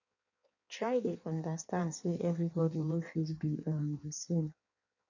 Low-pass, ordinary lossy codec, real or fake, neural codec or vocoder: 7.2 kHz; none; fake; codec, 16 kHz in and 24 kHz out, 1.1 kbps, FireRedTTS-2 codec